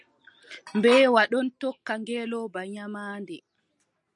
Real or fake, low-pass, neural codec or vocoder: real; 10.8 kHz; none